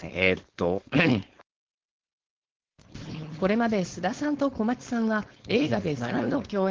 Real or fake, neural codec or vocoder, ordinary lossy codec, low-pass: fake; codec, 16 kHz, 4.8 kbps, FACodec; Opus, 16 kbps; 7.2 kHz